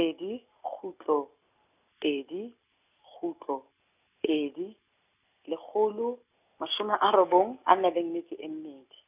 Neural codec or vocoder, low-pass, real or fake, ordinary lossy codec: none; 3.6 kHz; real; none